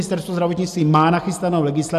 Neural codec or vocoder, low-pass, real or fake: none; 14.4 kHz; real